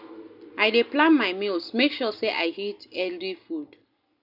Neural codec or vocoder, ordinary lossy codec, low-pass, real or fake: none; none; 5.4 kHz; real